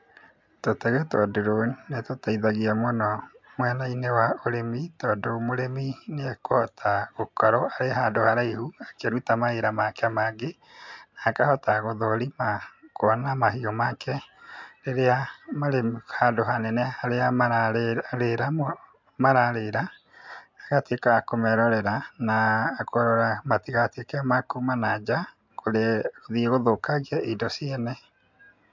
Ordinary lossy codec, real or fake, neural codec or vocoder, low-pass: MP3, 64 kbps; real; none; 7.2 kHz